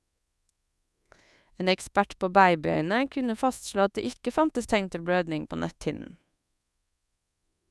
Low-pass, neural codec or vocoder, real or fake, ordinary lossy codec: none; codec, 24 kHz, 1.2 kbps, DualCodec; fake; none